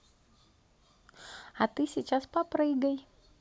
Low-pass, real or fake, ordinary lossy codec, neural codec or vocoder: none; real; none; none